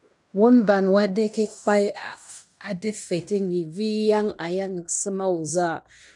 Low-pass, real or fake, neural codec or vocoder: 10.8 kHz; fake; codec, 16 kHz in and 24 kHz out, 0.9 kbps, LongCat-Audio-Codec, fine tuned four codebook decoder